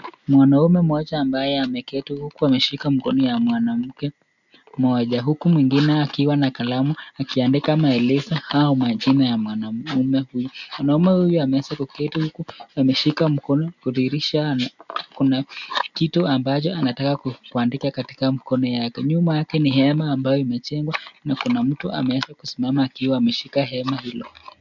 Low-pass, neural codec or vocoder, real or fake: 7.2 kHz; none; real